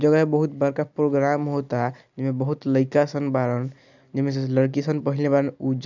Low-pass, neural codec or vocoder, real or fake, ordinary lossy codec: 7.2 kHz; none; real; none